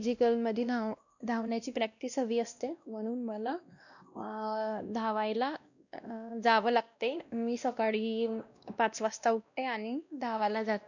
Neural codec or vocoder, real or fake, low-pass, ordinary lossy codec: codec, 16 kHz, 1 kbps, X-Codec, WavLM features, trained on Multilingual LibriSpeech; fake; 7.2 kHz; none